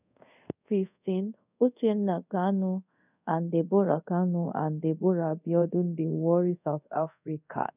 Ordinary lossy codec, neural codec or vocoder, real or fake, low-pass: none; codec, 24 kHz, 0.5 kbps, DualCodec; fake; 3.6 kHz